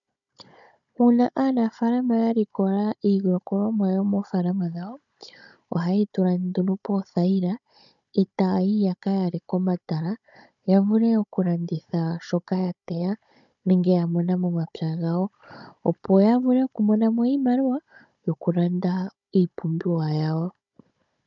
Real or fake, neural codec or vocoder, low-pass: fake; codec, 16 kHz, 16 kbps, FunCodec, trained on Chinese and English, 50 frames a second; 7.2 kHz